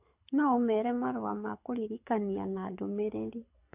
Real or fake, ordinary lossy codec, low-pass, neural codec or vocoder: fake; AAC, 32 kbps; 3.6 kHz; codec, 24 kHz, 6 kbps, HILCodec